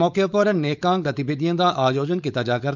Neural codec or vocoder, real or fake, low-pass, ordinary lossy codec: codec, 16 kHz, 4.8 kbps, FACodec; fake; 7.2 kHz; none